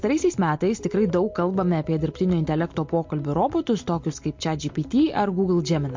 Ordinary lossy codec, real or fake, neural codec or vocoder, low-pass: MP3, 48 kbps; real; none; 7.2 kHz